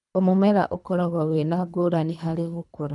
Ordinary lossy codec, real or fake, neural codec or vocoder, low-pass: none; fake; codec, 24 kHz, 3 kbps, HILCodec; 10.8 kHz